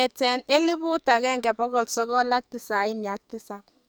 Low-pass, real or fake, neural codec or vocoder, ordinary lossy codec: none; fake; codec, 44.1 kHz, 2.6 kbps, SNAC; none